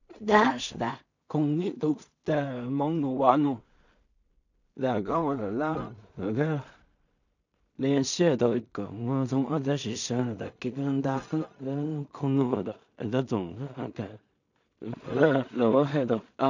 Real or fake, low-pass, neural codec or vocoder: fake; 7.2 kHz; codec, 16 kHz in and 24 kHz out, 0.4 kbps, LongCat-Audio-Codec, two codebook decoder